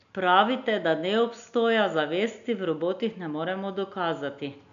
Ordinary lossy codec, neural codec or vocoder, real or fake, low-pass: none; none; real; 7.2 kHz